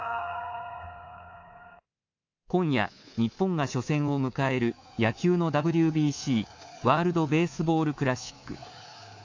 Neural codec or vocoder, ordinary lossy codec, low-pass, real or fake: codec, 24 kHz, 3.1 kbps, DualCodec; AAC, 48 kbps; 7.2 kHz; fake